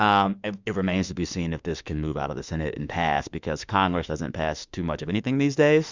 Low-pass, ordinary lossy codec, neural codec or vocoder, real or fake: 7.2 kHz; Opus, 64 kbps; autoencoder, 48 kHz, 32 numbers a frame, DAC-VAE, trained on Japanese speech; fake